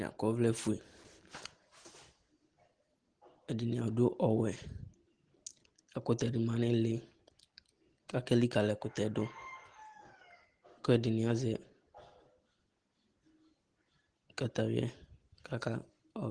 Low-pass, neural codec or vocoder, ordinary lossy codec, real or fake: 9.9 kHz; none; Opus, 16 kbps; real